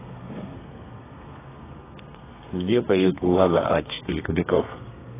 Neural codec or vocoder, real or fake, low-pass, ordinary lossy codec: codec, 32 kHz, 1.9 kbps, SNAC; fake; 3.6 kHz; AAC, 16 kbps